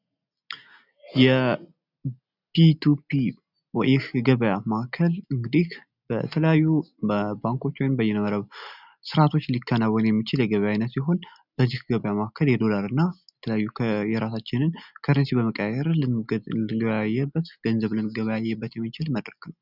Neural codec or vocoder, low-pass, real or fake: none; 5.4 kHz; real